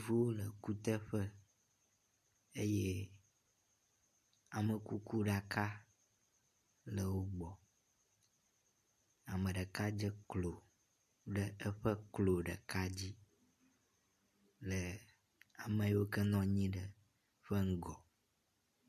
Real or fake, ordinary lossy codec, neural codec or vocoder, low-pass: real; MP3, 64 kbps; none; 14.4 kHz